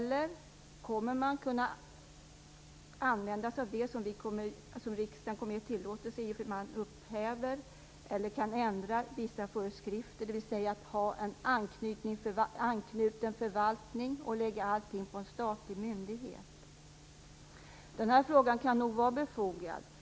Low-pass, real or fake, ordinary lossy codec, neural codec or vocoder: none; real; none; none